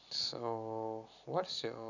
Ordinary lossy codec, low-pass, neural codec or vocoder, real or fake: none; 7.2 kHz; none; real